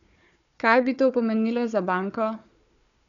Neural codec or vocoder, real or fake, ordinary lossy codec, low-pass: codec, 16 kHz, 4 kbps, FunCodec, trained on Chinese and English, 50 frames a second; fake; none; 7.2 kHz